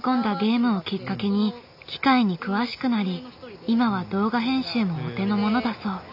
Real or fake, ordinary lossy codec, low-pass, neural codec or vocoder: real; none; 5.4 kHz; none